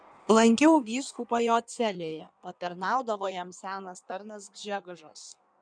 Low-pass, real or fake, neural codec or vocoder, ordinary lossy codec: 9.9 kHz; fake; codec, 16 kHz in and 24 kHz out, 1.1 kbps, FireRedTTS-2 codec; MP3, 96 kbps